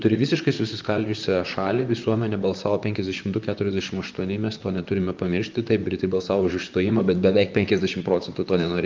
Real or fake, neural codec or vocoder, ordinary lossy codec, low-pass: fake; vocoder, 44.1 kHz, 128 mel bands, Pupu-Vocoder; Opus, 24 kbps; 7.2 kHz